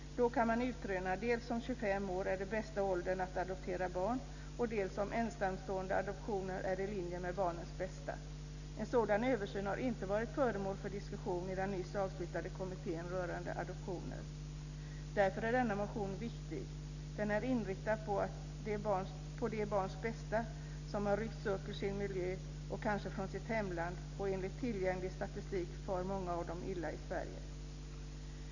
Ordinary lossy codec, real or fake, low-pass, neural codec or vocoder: none; real; 7.2 kHz; none